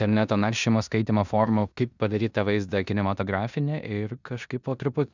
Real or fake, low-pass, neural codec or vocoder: fake; 7.2 kHz; codec, 16 kHz in and 24 kHz out, 0.9 kbps, LongCat-Audio-Codec, four codebook decoder